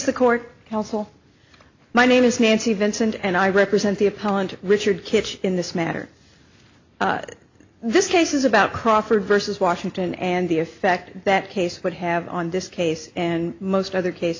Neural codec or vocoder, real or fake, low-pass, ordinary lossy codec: none; real; 7.2 kHz; AAC, 48 kbps